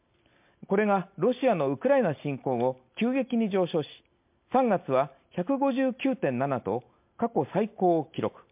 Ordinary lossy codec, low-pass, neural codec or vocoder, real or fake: MP3, 32 kbps; 3.6 kHz; none; real